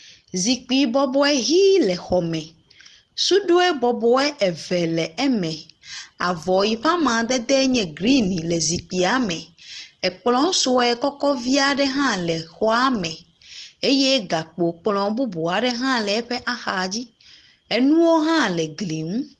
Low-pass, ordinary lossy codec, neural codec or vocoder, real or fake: 10.8 kHz; Opus, 16 kbps; none; real